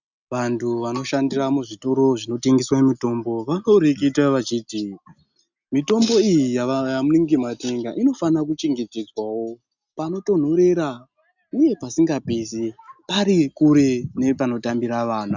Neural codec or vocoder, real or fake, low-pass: none; real; 7.2 kHz